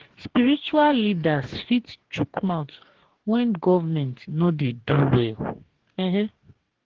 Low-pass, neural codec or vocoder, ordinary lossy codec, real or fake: 7.2 kHz; codec, 44.1 kHz, 2.6 kbps, DAC; Opus, 16 kbps; fake